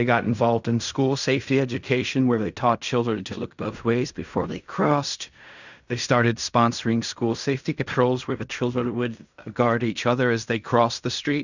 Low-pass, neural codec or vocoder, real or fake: 7.2 kHz; codec, 16 kHz in and 24 kHz out, 0.4 kbps, LongCat-Audio-Codec, fine tuned four codebook decoder; fake